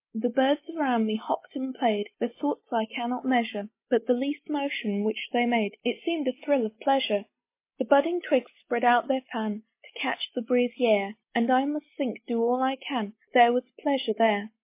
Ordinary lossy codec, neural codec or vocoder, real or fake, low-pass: MP3, 24 kbps; none; real; 3.6 kHz